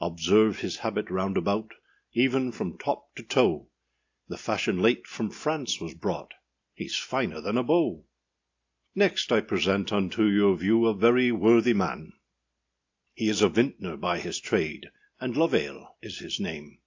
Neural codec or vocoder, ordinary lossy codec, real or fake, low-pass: none; AAC, 48 kbps; real; 7.2 kHz